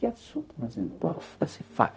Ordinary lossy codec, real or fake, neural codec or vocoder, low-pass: none; fake; codec, 16 kHz, 0.4 kbps, LongCat-Audio-Codec; none